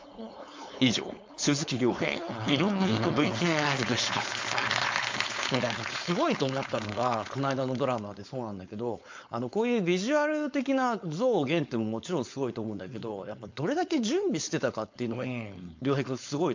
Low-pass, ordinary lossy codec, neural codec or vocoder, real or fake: 7.2 kHz; MP3, 64 kbps; codec, 16 kHz, 4.8 kbps, FACodec; fake